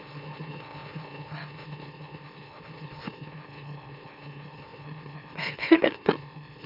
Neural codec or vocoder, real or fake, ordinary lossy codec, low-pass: autoencoder, 44.1 kHz, a latent of 192 numbers a frame, MeloTTS; fake; none; 5.4 kHz